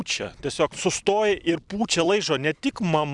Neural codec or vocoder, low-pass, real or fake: vocoder, 44.1 kHz, 128 mel bands every 256 samples, BigVGAN v2; 10.8 kHz; fake